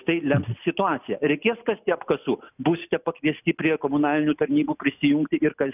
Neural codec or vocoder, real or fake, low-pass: none; real; 3.6 kHz